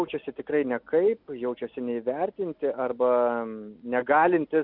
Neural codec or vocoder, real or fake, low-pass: none; real; 5.4 kHz